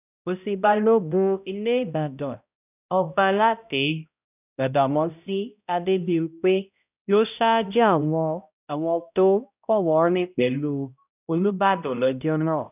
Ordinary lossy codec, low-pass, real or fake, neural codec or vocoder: none; 3.6 kHz; fake; codec, 16 kHz, 0.5 kbps, X-Codec, HuBERT features, trained on balanced general audio